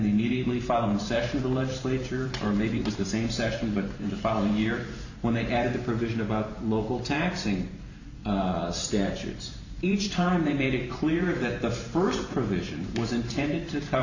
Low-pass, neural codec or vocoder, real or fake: 7.2 kHz; vocoder, 44.1 kHz, 128 mel bands every 512 samples, BigVGAN v2; fake